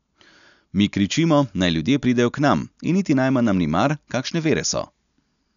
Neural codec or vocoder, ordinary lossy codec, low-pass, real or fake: none; none; 7.2 kHz; real